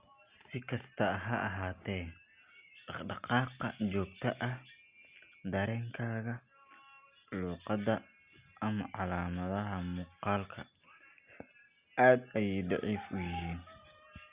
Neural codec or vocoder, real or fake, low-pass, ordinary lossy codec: none; real; 3.6 kHz; Opus, 64 kbps